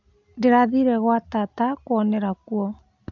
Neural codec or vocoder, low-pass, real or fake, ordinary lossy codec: none; 7.2 kHz; real; AAC, 48 kbps